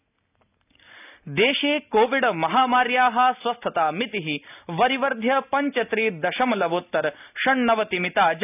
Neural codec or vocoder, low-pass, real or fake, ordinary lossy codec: none; 3.6 kHz; real; none